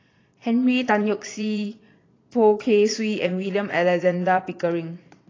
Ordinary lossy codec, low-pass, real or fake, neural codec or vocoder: AAC, 32 kbps; 7.2 kHz; fake; vocoder, 22.05 kHz, 80 mel bands, Vocos